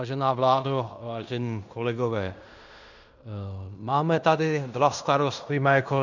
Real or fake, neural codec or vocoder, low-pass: fake; codec, 16 kHz in and 24 kHz out, 0.9 kbps, LongCat-Audio-Codec, fine tuned four codebook decoder; 7.2 kHz